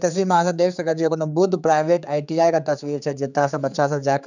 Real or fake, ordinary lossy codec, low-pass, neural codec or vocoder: fake; none; 7.2 kHz; codec, 16 kHz, 4 kbps, X-Codec, HuBERT features, trained on general audio